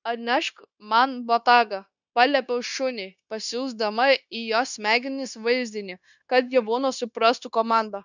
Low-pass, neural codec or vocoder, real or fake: 7.2 kHz; codec, 16 kHz, 0.9 kbps, LongCat-Audio-Codec; fake